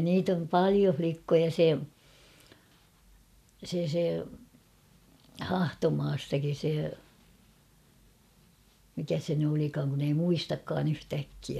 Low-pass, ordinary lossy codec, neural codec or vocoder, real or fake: 14.4 kHz; none; none; real